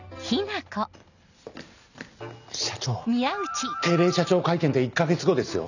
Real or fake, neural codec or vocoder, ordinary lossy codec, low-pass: real; none; none; 7.2 kHz